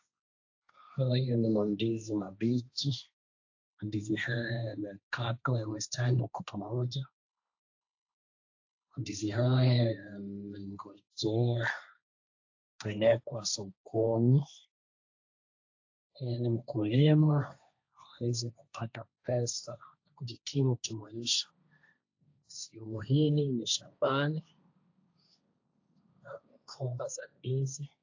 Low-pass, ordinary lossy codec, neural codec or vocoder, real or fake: 7.2 kHz; AAC, 48 kbps; codec, 16 kHz, 1.1 kbps, Voila-Tokenizer; fake